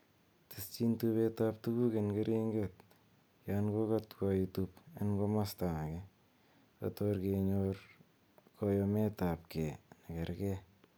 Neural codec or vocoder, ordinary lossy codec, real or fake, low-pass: none; none; real; none